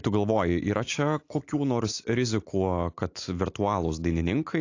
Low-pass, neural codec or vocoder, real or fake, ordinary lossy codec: 7.2 kHz; none; real; AAC, 48 kbps